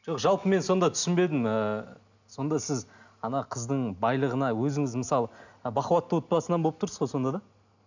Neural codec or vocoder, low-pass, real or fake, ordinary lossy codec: none; 7.2 kHz; real; none